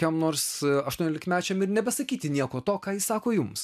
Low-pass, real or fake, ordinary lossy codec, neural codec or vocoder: 14.4 kHz; real; MP3, 96 kbps; none